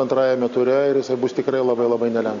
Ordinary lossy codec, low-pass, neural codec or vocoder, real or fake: MP3, 64 kbps; 7.2 kHz; none; real